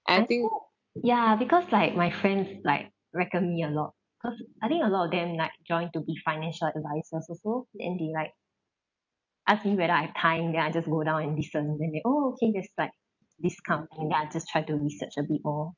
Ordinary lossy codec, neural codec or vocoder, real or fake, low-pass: none; none; real; 7.2 kHz